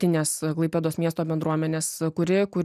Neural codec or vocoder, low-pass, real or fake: none; 14.4 kHz; real